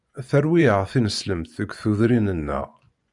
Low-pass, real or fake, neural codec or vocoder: 10.8 kHz; fake; vocoder, 24 kHz, 100 mel bands, Vocos